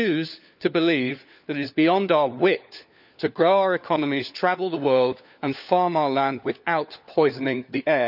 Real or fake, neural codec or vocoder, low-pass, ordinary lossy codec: fake; codec, 16 kHz, 4 kbps, FunCodec, trained on LibriTTS, 50 frames a second; 5.4 kHz; none